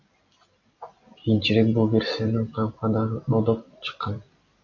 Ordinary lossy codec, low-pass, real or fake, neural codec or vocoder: AAC, 48 kbps; 7.2 kHz; real; none